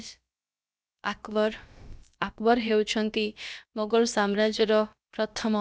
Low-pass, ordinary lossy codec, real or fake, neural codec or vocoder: none; none; fake; codec, 16 kHz, about 1 kbps, DyCAST, with the encoder's durations